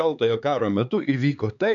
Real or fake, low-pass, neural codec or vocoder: fake; 7.2 kHz; codec, 16 kHz, 4 kbps, X-Codec, HuBERT features, trained on LibriSpeech